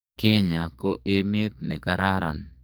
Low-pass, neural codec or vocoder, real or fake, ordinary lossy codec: none; codec, 44.1 kHz, 2.6 kbps, SNAC; fake; none